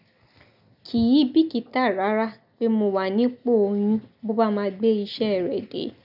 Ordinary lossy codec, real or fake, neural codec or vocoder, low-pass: none; real; none; 5.4 kHz